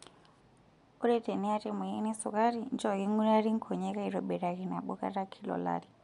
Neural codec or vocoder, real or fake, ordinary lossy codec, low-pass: none; real; MP3, 64 kbps; 10.8 kHz